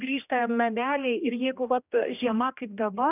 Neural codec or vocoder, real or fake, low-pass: codec, 16 kHz, 1 kbps, X-Codec, HuBERT features, trained on general audio; fake; 3.6 kHz